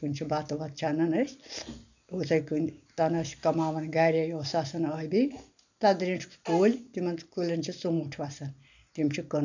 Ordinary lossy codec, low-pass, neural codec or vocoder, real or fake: none; 7.2 kHz; none; real